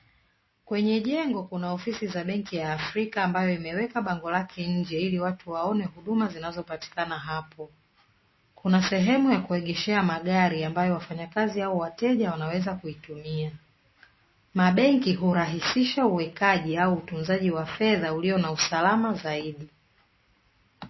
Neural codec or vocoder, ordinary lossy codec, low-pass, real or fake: none; MP3, 24 kbps; 7.2 kHz; real